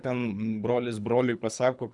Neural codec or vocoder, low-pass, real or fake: codec, 24 kHz, 3 kbps, HILCodec; 10.8 kHz; fake